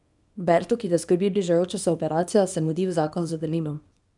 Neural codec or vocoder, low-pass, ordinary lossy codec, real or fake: codec, 24 kHz, 0.9 kbps, WavTokenizer, small release; 10.8 kHz; none; fake